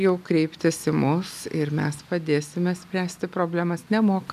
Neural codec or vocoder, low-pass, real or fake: none; 14.4 kHz; real